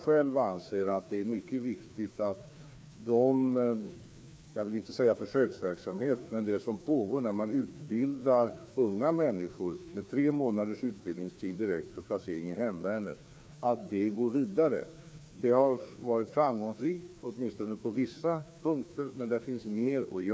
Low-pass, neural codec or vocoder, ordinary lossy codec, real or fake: none; codec, 16 kHz, 2 kbps, FreqCodec, larger model; none; fake